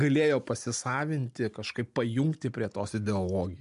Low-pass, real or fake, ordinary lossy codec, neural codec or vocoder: 10.8 kHz; real; MP3, 64 kbps; none